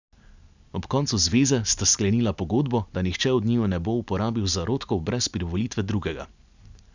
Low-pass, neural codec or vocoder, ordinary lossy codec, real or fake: 7.2 kHz; none; none; real